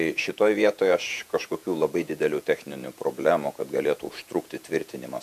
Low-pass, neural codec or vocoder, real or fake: 14.4 kHz; vocoder, 48 kHz, 128 mel bands, Vocos; fake